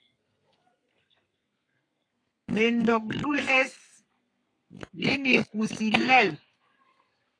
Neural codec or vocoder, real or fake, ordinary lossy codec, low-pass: codec, 32 kHz, 1.9 kbps, SNAC; fake; AAC, 48 kbps; 9.9 kHz